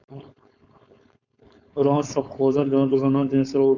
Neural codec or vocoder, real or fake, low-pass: codec, 16 kHz, 4.8 kbps, FACodec; fake; 7.2 kHz